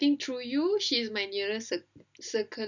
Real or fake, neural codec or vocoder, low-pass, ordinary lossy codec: real; none; 7.2 kHz; none